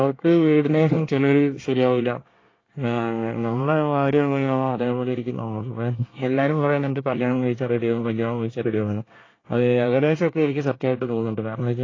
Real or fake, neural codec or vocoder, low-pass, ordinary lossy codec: fake; codec, 24 kHz, 1 kbps, SNAC; 7.2 kHz; AAC, 32 kbps